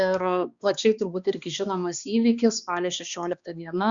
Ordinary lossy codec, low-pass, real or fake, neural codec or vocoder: Opus, 64 kbps; 7.2 kHz; fake; codec, 16 kHz, 2 kbps, X-Codec, HuBERT features, trained on balanced general audio